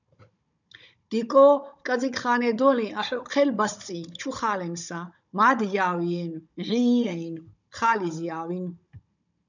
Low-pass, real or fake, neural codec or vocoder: 7.2 kHz; fake; codec, 16 kHz, 16 kbps, FunCodec, trained on Chinese and English, 50 frames a second